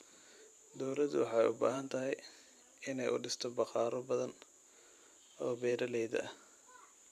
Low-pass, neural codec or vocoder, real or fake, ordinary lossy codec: 14.4 kHz; vocoder, 44.1 kHz, 128 mel bands every 512 samples, BigVGAN v2; fake; none